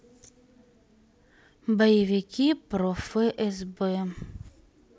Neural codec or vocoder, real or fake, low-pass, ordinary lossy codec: none; real; none; none